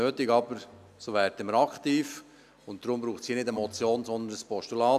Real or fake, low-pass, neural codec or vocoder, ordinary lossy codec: real; 14.4 kHz; none; none